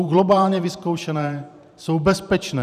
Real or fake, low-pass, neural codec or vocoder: fake; 14.4 kHz; vocoder, 44.1 kHz, 128 mel bands every 512 samples, BigVGAN v2